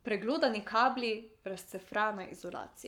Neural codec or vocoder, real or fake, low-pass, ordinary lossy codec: codec, 44.1 kHz, 7.8 kbps, Pupu-Codec; fake; 19.8 kHz; none